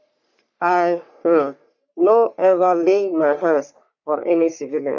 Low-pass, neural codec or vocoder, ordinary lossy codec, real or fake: 7.2 kHz; codec, 44.1 kHz, 3.4 kbps, Pupu-Codec; none; fake